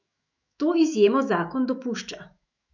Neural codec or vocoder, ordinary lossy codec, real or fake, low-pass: autoencoder, 48 kHz, 128 numbers a frame, DAC-VAE, trained on Japanese speech; none; fake; 7.2 kHz